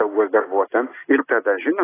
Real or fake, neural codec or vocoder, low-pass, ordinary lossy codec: real; none; 3.6 kHz; AAC, 16 kbps